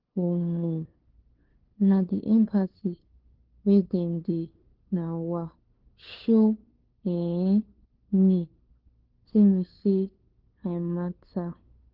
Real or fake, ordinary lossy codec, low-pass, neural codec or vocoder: fake; Opus, 16 kbps; 5.4 kHz; codec, 16 kHz, 2 kbps, FunCodec, trained on LibriTTS, 25 frames a second